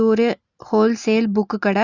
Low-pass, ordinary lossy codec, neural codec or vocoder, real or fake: 7.2 kHz; Opus, 64 kbps; none; real